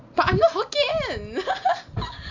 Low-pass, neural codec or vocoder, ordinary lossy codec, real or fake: 7.2 kHz; none; MP3, 48 kbps; real